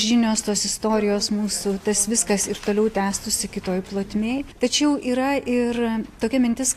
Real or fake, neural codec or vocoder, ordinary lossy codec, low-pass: real; none; AAC, 64 kbps; 14.4 kHz